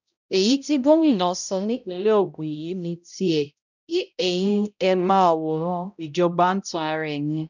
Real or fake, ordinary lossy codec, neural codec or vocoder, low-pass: fake; none; codec, 16 kHz, 0.5 kbps, X-Codec, HuBERT features, trained on balanced general audio; 7.2 kHz